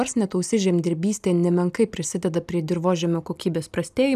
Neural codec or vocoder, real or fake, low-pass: none; real; 14.4 kHz